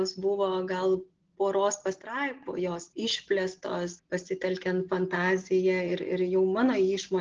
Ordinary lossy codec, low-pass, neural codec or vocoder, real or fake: Opus, 16 kbps; 7.2 kHz; none; real